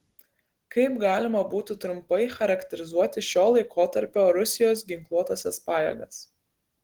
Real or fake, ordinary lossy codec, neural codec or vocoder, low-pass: real; Opus, 16 kbps; none; 19.8 kHz